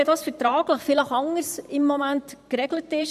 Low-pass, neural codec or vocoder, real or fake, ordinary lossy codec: 14.4 kHz; vocoder, 44.1 kHz, 128 mel bands every 512 samples, BigVGAN v2; fake; none